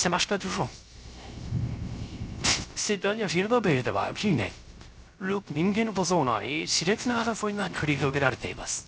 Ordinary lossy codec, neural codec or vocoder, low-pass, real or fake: none; codec, 16 kHz, 0.3 kbps, FocalCodec; none; fake